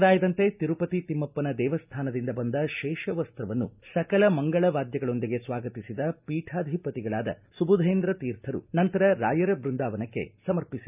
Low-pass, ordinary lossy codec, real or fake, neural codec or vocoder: 3.6 kHz; none; real; none